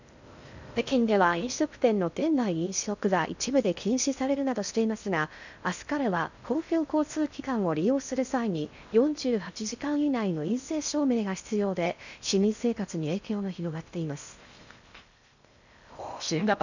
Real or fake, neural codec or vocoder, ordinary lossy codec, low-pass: fake; codec, 16 kHz in and 24 kHz out, 0.6 kbps, FocalCodec, streaming, 2048 codes; none; 7.2 kHz